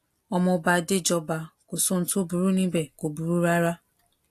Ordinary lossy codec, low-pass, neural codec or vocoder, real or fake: AAC, 64 kbps; 14.4 kHz; none; real